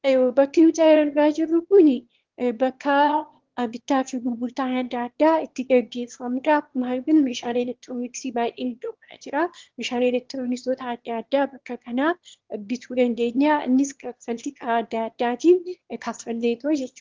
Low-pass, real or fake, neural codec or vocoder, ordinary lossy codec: 7.2 kHz; fake; autoencoder, 22.05 kHz, a latent of 192 numbers a frame, VITS, trained on one speaker; Opus, 16 kbps